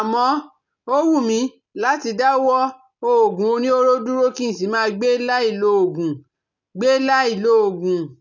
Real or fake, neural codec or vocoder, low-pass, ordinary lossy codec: real; none; 7.2 kHz; none